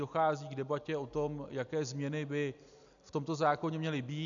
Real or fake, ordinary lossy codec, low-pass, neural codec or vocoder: real; MP3, 96 kbps; 7.2 kHz; none